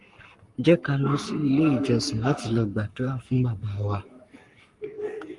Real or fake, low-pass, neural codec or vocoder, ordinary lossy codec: fake; 10.8 kHz; codec, 44.1 kHz, 7.8 kbps, Pupu-Codec; Opus, 32 kbps